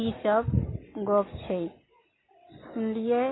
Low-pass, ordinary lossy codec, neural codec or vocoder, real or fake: 7.2 kHz; AAC, 16 kbps; none; real